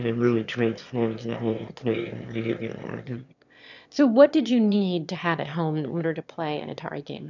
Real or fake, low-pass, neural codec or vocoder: fake; 7.2 kHz; autoencoder, 22.05 kHz, a latent of 192 numbers a frame, VITS, trained on one speaker